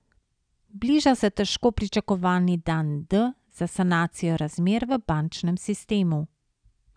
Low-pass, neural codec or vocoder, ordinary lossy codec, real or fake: 9.9 kHz; none; none; real